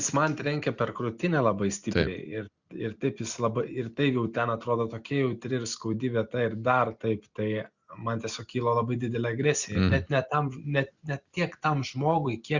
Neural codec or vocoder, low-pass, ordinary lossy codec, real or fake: none; 7.2 kHz; Opus, 64 kbps; real